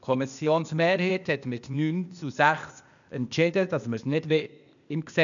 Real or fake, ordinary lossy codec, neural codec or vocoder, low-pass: fake; none; codec, 16 kHz, 0.8 kbps, ZipCodec; 7.2 kHz